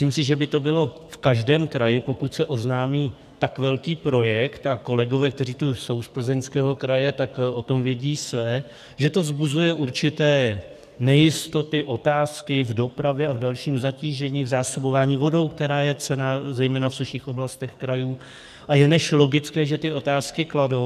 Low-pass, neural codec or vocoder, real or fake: 14.4 kHz; codec, 44.1 kHz, 2.6 kbps, SNAC; fake